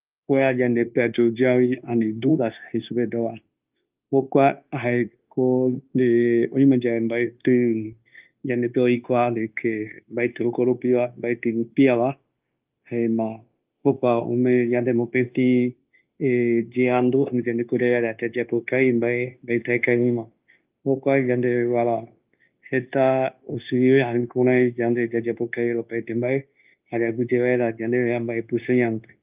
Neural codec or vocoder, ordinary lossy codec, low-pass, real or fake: codec, 16 kHz, 0.9 kbps, LongCat-Audio-Codec; Opus, 24 kbps; 3.6 kHz; fake